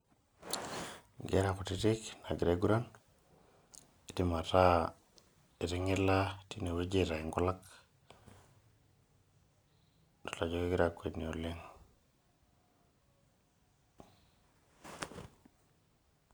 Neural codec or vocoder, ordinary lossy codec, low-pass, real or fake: none; none; none; real